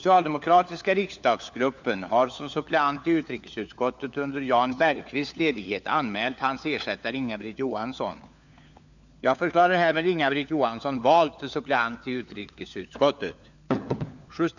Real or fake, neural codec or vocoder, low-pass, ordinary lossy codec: fake; codec, 16 kHz, 4 kbps, FunCodec, trained on LibriTTS, 50 frames a second; 7.2 kHz; none